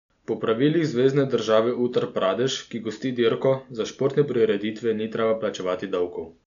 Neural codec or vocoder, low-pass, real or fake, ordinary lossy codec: none; 7.2 kHz; real; none